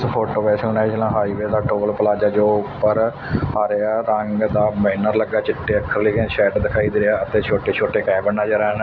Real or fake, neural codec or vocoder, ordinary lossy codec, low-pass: real; none; none; 7.2 kHz